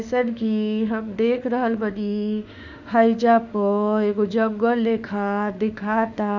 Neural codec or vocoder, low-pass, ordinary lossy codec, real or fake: autoencoder, 48 kHz, 32 numbers a frame, DAC-VAE, trained on Japanese speech; 7.2 kHz; none; fake